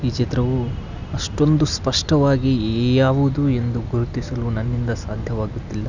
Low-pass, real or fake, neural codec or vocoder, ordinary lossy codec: 7.2 kHz; real; none; none